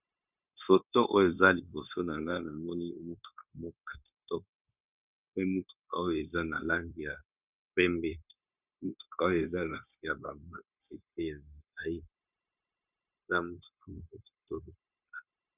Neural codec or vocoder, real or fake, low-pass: codec, 16 kHz, 0.9 kbps, LongCat-Audio-Codec; fake; 3.6 kHz